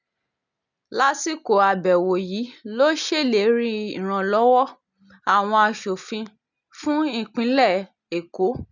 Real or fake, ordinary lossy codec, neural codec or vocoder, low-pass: real; none; none; 7.2 kHz